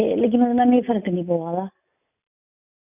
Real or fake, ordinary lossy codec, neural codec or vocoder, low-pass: real; none; none; 3.6 kHz